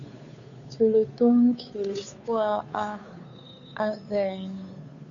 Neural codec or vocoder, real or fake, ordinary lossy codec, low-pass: codec, 16 kHz, 2 kbps, FunCodec, trained on Chinese and English, 25 frames a second; fake; AAC, 64 kbps; 7.2 kHz